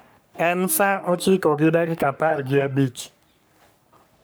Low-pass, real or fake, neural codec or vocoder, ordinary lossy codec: none; fake; codec, 44.1 kHz, 3.4 kbps, Pupu-Codec; none